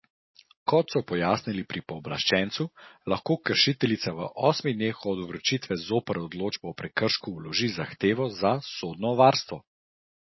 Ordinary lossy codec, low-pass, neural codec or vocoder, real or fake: MP3, 24 kbps; 7.2 kHz; none; real